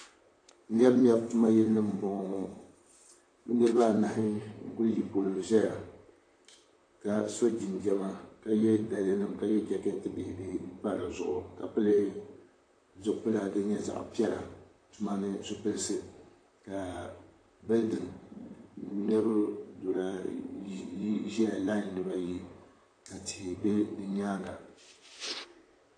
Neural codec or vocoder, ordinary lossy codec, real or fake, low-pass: vocoder, 44.1 kHz, 128 mel bands, Pupu-Vocoder; AAC, 48 kbps; fake; 9.9 kHz